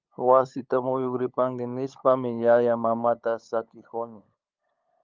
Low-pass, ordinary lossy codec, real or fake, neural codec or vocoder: 7.2 kHz; Opus, 24 kbps; fake; codec, 16 kHz, 8 kbps, FunCodec, trained on LibriTTS, 25 frames a second